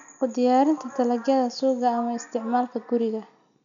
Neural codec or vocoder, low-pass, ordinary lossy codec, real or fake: none; 7.2 kHz; none; real